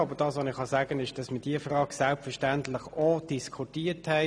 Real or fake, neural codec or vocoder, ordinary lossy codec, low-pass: real; none; none; none